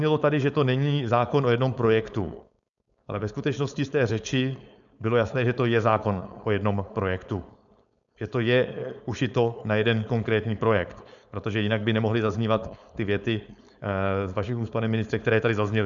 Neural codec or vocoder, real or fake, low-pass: codec, 16 kHz, 4.8 kbps, FACodec; fake; 7.2 kHz